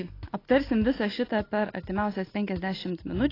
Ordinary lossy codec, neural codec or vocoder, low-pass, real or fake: AAC, 24 kbps; none; 5.4 kHz; real